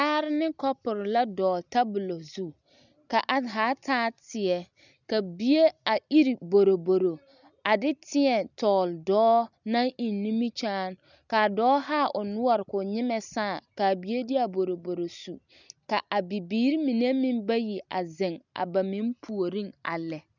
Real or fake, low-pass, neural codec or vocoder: real; 7.2 kHz; none